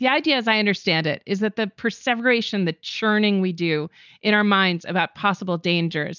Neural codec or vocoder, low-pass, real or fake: none; 7.2 kHz; real